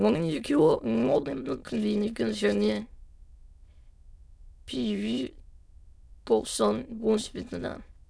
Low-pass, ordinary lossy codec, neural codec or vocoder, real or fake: none; none; autoencoder, 22.05 kHz, a latent of 192 numbers a frame, VITS, trained on many speakers; fake